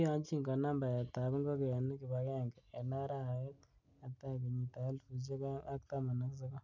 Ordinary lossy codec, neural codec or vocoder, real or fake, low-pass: none; none; real; 7.2 kHz